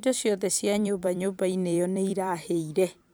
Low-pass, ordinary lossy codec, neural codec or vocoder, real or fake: none; none; vocoder, 44.1 kHz, 128 mel bands every 256 samples, BigVGAN v2; fake